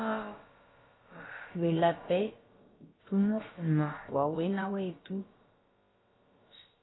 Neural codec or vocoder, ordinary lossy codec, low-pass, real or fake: codec, 16 kHz, about 1 kbps, DyCAST, with the encoder's durations; AAC, 16 kbps; 7.2 kHz; fake